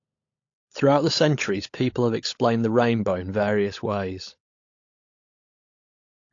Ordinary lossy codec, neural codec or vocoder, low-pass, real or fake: AAC, 48 kbps; codec, 16 kHz, 16 kbps, FunCodec, trained on LibriTTS, 50 frames a second; 7.2 kHz; fake